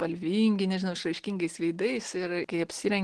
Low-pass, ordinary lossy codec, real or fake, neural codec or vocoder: 10.8 kHz; Opus, 16 kbps; real; none